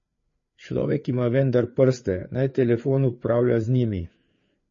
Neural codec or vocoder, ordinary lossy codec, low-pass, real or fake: codec, 16 kHz, 4 kbps, FreqCodec, larger model; MP3, 32 kbps; 7.2 kHz; fake